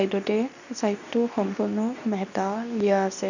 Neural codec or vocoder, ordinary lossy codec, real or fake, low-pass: codec, 24 kHz, 0.9 kbps, WavTokenizer, medium speech release version 2; none; fake; 7.2 kHz